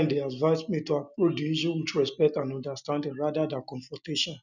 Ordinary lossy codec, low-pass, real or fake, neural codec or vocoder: none; 7.2 kHz; real; none